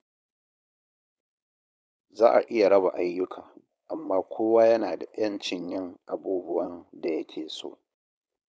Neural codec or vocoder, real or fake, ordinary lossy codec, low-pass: codec, 16 kHz, 4.8 kbps, FACodec; fake; none; none